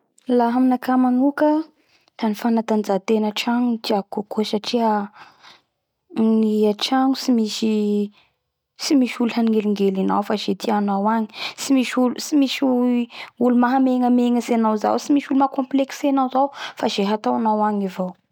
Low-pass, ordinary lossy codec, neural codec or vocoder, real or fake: 19.8 kHz; none; none; real